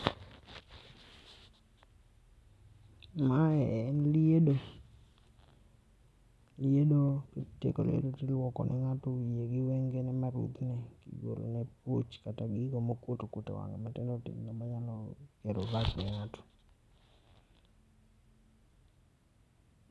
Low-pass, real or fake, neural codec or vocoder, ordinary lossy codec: none; real; none; none